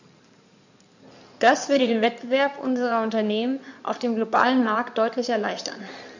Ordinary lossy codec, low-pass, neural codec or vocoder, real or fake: none; 7.2 kHz; codec, 16 kHz in and 24 kHz out, 2.2 kbps, FireRedTTS-2 codec; fake